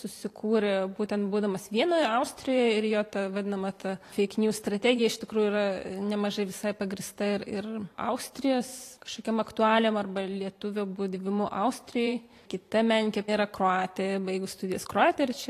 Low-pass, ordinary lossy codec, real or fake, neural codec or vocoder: 14.4 kHz; AAC, 48 kbps; fake; vocoder, 44.1 kHz, 128 mel bands every 512 samples, BigVGAN v2